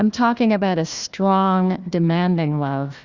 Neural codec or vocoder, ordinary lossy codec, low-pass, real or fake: codec, 16 kHz, 1 kbps, FunCodec, trained on Chinese and English, 50 frames a second; Opus, 64 kbps; 7.2 kHz; fake